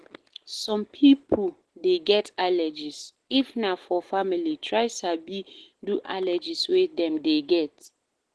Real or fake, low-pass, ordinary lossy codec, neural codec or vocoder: real; 10.8 kHz; Opus, 16 kbps; none